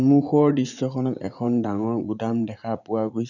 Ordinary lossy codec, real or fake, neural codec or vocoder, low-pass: none; fake; vocoder, 44.1 kHz, 80 mel bands, Vocos; 7.2 kHz